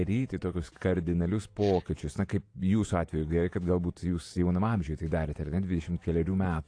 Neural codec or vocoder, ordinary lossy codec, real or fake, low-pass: vocoder, 48 kHz, 128 mel bands, Vocos; AAC, 48 kbps; fake; 9.9 kHz